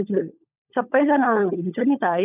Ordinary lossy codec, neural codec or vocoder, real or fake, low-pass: none; codec, 16 kHz, 16 kbps, FunCodec, trained on LibriTTS, 50 frames a second; fake; 3.6 kHz